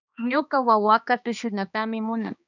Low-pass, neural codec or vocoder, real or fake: 7.2 kHz; codec, 16 kHz, 2 kbps, X-Codec, HuBERT features, trained on balanced general audio; fake